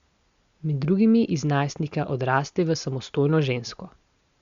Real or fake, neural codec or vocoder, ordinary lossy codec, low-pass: real; none; Opus, 64 kbps; 7.2 kHz